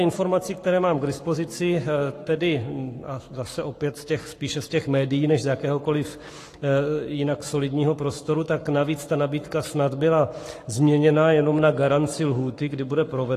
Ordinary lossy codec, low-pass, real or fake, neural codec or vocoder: AAC, 48 kbps; 14.4 kHz; fake; codec, 44.1 kHz, 7.8 kbps, Pupu-Codec